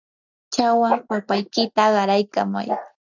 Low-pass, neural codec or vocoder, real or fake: 7.2 kHz; none; real